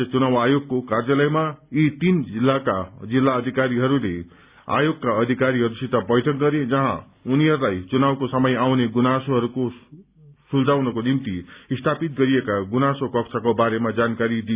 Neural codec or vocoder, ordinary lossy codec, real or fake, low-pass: none; Opus, 64 kbps; real; 3.6 kHz